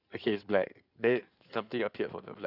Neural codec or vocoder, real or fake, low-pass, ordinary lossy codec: codec, 16 kHz in and 24 kHz out, 2.2 kbps, FireRedTTS-2 codec; fake; 5.4 kHz; none